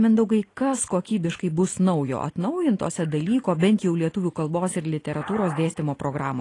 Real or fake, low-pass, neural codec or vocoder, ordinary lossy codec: real; 10.8 kHz; none; AAC, 32 kbps